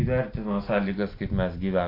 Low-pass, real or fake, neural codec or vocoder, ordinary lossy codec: 5.4 kHz; real; none; AAC, 32 kbps